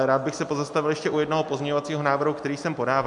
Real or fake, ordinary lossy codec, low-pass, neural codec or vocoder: real; MP3, 64 kbps; 10.8 kHz; none